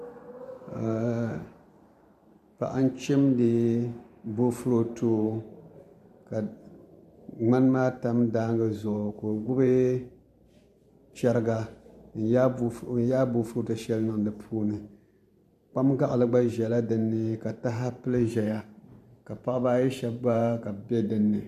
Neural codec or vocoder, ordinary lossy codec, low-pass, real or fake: vocoder, 44.1 kHz, 128 mel bands every 512 samples, BigVGAN v2; MP3, 96 kbps; 14.4 kHz; fake